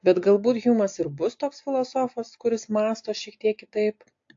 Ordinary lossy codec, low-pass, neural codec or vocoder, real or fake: AAC, 48 kbps; 7.2 kHz; none; real